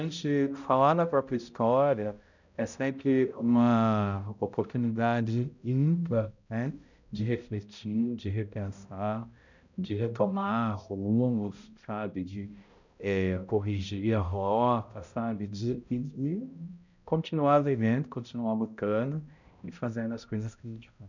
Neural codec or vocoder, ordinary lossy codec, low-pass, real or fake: codec, 16 kHz, 0.5 kbps, X-Codec, HuBERT features, trained on balanced general audio; none; 7.2 kHz; fake